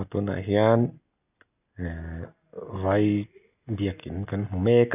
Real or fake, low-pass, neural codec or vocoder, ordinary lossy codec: real; 3.6 kHz; none; none